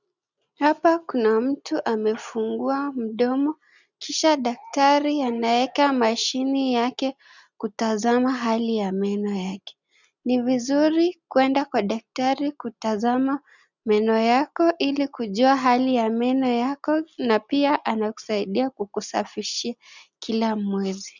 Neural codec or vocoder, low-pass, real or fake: none; 7.2 kHz; real